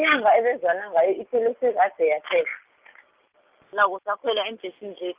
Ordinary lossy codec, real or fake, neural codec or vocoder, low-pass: Opus, 32 kbps; real; none; 3.6 kHz